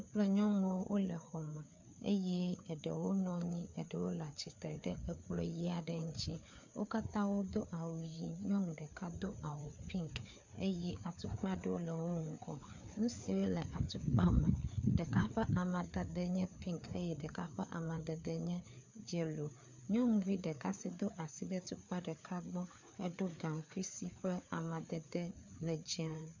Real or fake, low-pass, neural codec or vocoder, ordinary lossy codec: fake; 7.2 kHz; codec, 16 kHz, 4 kbps, FreqCodec, larger model; MP3, 64 kbps